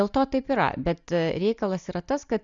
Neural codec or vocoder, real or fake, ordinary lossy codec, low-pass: none; real; Opus, 64 kbps; 7.2 kHz